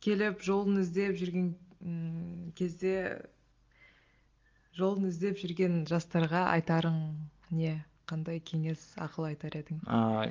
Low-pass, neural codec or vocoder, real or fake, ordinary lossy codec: 7.2 kHz; none; real; Opus, 32 kbps